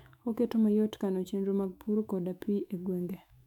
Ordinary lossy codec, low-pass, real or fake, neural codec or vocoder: none; 19.8 kHz; fake; autoencoder, 48 kHz, 128 numbers a frame, DAC-VAE, trained on Japanese speech